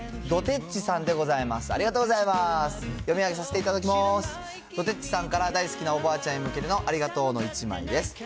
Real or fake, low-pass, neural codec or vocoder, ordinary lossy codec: real; none; none; none